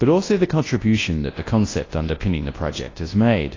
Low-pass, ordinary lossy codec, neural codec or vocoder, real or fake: 7.2 kHz; AAC, 32 kbps; codec, 24 kHz, 0.9 kbps, WavTokenizer, large speech release; fake